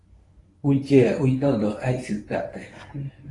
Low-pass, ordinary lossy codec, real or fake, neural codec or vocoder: 10.8 kHz; AAC, 32 kbps; fake; codec, 24 kHz, 0.9 kbps, WavTokenizer, medium speech release version 1